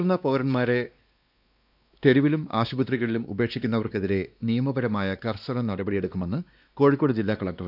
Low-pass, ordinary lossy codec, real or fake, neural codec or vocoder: 5.4 kHz; none; fake; codec, 16 kHz, 2 kbps, X-Codec, WavLM features, trained on Multilingual LibriSpeech